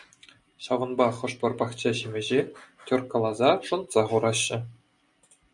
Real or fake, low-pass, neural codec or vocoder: real; 10.8 kHz; none